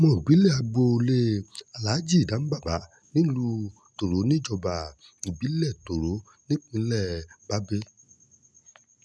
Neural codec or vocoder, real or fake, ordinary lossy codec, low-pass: none; real; none; none